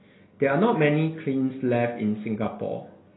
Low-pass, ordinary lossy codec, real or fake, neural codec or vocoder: 7.2 kHz; AAC, 16 kbps; real; none